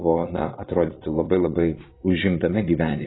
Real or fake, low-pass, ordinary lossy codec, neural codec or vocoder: fake; 7.2 kHz; AAC, 16 kbps; vocoder, 22.05 kHz, 80 mel bands, Vocos